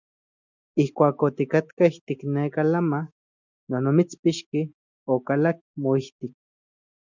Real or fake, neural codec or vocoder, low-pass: real; none; 7.2 kHz